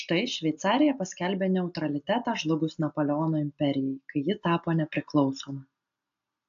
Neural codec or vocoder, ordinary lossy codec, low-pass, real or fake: none; AAC, 64 kbps; 7.2 kHz; real